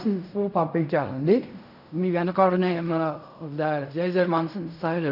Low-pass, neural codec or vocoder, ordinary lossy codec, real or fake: 5.4 kHz; codec, 16 kHz in and 24 kHz out, 0.4 kbps, LongCat-Audio-Codec, fine tuned four codebook decoder; none; fake